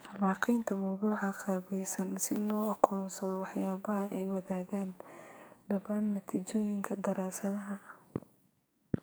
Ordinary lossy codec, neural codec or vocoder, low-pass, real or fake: none; codec, 44.1 kHz, 2.6 kbps, SNAC; none; fake